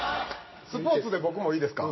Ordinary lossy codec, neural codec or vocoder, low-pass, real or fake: MP3, 24 kbps; none; 7.2 kHz; real